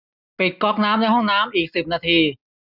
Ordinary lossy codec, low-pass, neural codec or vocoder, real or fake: none; 5.4 kHz; none; real